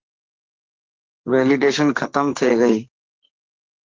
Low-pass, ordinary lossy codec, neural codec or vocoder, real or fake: 7.2 kHz; Opus, 32 kbps; codec, 44.1 kHz, 2.6 kbps, SNAC; fake